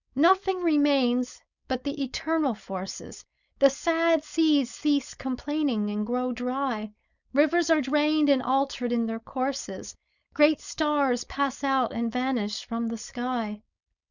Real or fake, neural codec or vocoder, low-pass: fake; codec, 16 kHz, 4.8 kbps, FACodec; 7.2 kHz